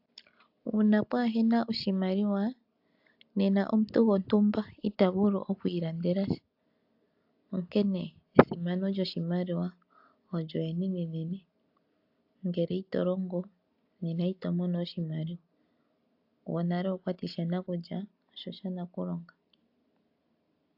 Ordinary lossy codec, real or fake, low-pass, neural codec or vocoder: AAC, 48 kbps; real; 5.4 kHz; none